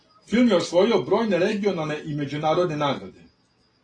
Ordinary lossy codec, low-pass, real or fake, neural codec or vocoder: AAC, 32 kbps; 9.9 kHz; real; none